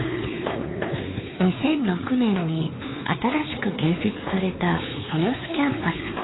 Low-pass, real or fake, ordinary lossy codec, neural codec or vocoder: 7.2 kHz; fake; AAC, 16 kbps; codec, 16 kHz, 4 kbps, X-Codec, WavLM features, trained on Multilingual LibriSpeech